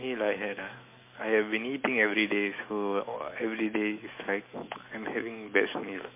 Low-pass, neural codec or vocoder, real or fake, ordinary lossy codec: 3.6 kHz; autoencoder, 48 kHz, 128 numbers a frame, DAC-VAE, trained on Japanese speech; fake; MP3, 24 kbps